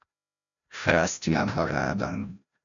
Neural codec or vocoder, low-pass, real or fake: codec, 16 kHz, 0.5 kbps, FreqCodec, larger model; 7.2 kHz; fake